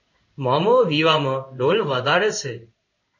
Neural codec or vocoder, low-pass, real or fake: codec, 16 kHz in and 24 kHz out, 1 kbps, XY-Tokenizer; 7.2 kHz; fake